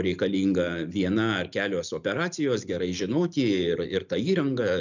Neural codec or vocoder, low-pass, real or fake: none; 7.2 kHz; real